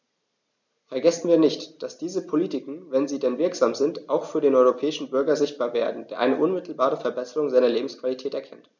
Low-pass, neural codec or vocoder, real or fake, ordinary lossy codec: 7.2 kHz; none; real; none